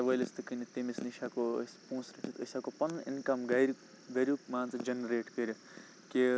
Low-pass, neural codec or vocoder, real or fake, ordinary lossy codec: none; none; real; none